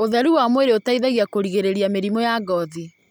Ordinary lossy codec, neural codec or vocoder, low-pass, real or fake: none; none; none; real